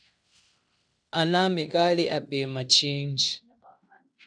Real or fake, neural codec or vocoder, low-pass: fake; codec, 16 kHz in and 24 kHz out, 0.9 kbps, LongCat-Audio-Codec, fine tuned four codebook decoder; 9.9 kHz